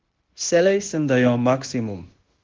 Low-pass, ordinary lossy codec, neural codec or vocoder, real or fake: 7.2 kHz; Opus, 16 kbps; codec, 16 kHz, 0.9 kbps, LongCat-Audio-Codec; fake